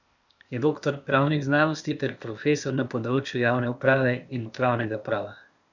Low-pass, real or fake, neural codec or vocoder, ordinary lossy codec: 7.2 kHz; fake; codec, 16 kHz, 0.8 kbps, ZipCodec; none